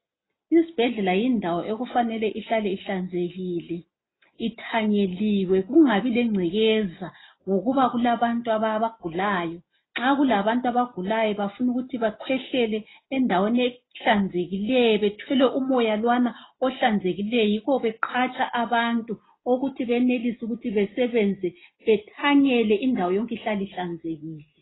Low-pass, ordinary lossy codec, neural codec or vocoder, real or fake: 7.2 kHz; AAC, 16 kbps; none; real